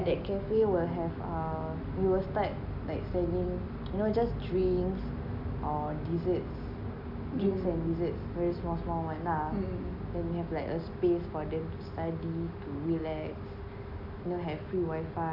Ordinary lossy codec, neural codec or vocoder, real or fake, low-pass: none; none; real; 5.4 kHz